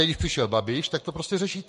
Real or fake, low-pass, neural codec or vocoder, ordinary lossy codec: fake; 14.4 kHz; codec, 44.1 kHz, 7.8 kbps, Pupu-Codec; MP3, 48 kbps